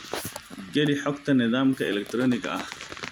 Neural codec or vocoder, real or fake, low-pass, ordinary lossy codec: vocoder, 44.1 kHz, 128 mel bands every 256 samples, BigVGAN v2; fake; none; none